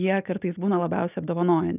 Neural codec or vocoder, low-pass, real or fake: none; 3.6 kHz; real